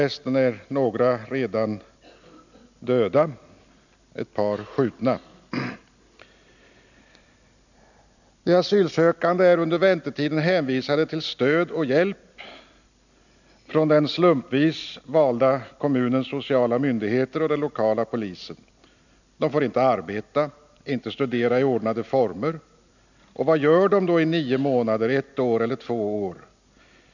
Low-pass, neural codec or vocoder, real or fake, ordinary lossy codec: 7.2 kHz; none; real; none